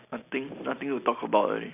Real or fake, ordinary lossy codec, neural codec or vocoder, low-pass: real; none; none; 3.6 kHz